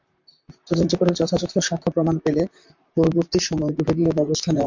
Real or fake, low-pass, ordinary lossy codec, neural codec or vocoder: fake; 7.2 kHz; MP3, 64 kbps; vocoder, 44.1 kHz, 128 mel bands, Pupu-Vocoder